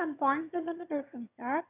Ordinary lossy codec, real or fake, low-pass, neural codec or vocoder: none; fake; 3.6 kHz; autoencoder, 22.05 kHz, a latent of 192 numbers a frame, VITS, trained on one speaker